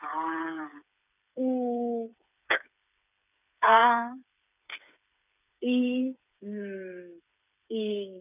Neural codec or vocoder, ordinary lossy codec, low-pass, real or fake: codec, 16 kHz, 8 kbps, FreqCodec, smaller model; none; 3.6 kHz; fake